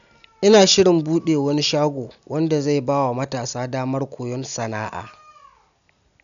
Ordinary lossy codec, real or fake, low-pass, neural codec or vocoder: none; real; 7.2 kHz; none